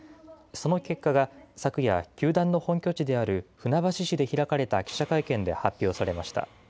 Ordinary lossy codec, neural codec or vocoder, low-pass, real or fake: none; none; none; real